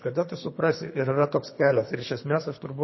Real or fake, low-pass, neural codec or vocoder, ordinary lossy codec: fake; 7.2 kHz; codec, 24 kHz, 3 kbps, HILCodec; MP3, 24 kbps